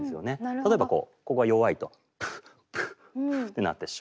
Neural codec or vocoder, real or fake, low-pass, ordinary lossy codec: none; real; none; none